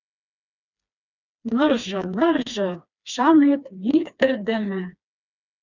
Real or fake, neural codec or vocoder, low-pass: fake; codec, 16 kHz, 2 kbps, FreqCodec, smaller model; 7.2 kHz